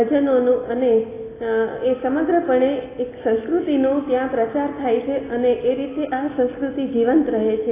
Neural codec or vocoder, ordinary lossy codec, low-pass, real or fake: none; AAC, 16 kbps; 3.6 kHz; real